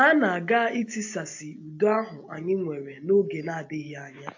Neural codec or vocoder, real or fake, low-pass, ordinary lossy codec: none; real; 7.2 kHz; AAC, 48 kbps